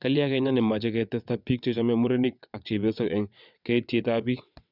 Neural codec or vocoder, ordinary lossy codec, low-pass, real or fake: vocoder, 22.05 kHz, 80 mel bands, WaveNeXt; none; 5.4 kHz; fake